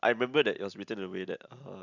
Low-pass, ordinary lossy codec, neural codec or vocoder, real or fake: 7.2 kHz; none; none; real